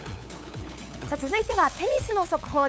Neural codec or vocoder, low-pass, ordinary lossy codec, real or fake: codec, 16 kHz, 8 kbps, FunCodec, trained on LibriTTS, 25 frames a second; none; none; fake